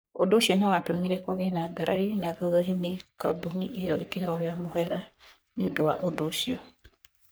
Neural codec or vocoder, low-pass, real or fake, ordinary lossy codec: codec, 44.1 kHz, 3.4 kbps, Pupu-Codec; none; fake; none